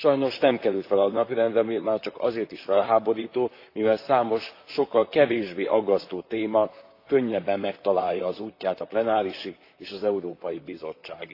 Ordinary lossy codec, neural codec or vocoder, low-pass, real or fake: AAC, 32 kbps; vocoder, 44.1 kHz, 128 mel bands, Pupu-Vocoder; 5.4 kHz; fake